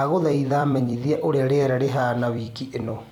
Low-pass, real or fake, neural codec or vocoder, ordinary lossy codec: 19.8 kHz; fake; vocoder, 44.1 kHz, 128 mel bands every 256 samples, BigVGAN v2; none